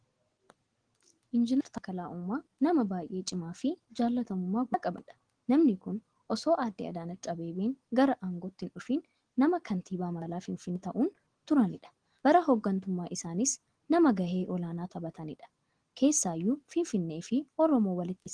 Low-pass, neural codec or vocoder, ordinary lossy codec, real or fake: 9.9 kHz; none; Opus, 16 kbps; real